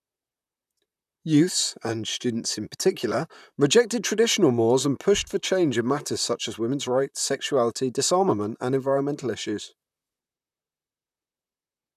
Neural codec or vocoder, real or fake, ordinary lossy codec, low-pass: vocoder, 44.1 kHz, 128 mel bands, Pupu-Vocoder; fake; none; 14.4 kHz